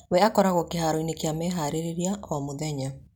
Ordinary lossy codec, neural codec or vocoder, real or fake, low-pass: none; none; real; 19.8 kHz